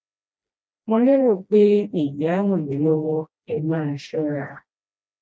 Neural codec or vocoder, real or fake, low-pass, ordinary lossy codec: codec, 16 kHz, 1 kbps, FreqCodec, smaller model; fake; none; none